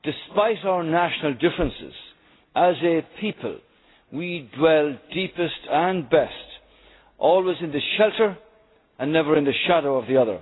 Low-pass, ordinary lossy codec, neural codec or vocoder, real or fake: 7.2 kHz; AAC, 16 kbps; none; real